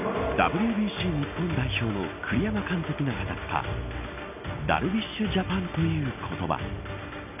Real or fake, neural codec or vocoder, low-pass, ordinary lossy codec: real; none; 3.6 kHz; none